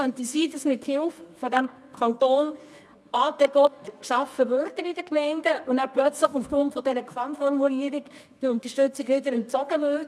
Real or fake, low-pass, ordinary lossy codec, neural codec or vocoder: fake; none; none; codec, 24 kHz, 0.9 kbps, WavTokenizer, medium music audio release